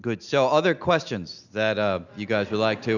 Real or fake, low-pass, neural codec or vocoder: real; 7.2 kHz; none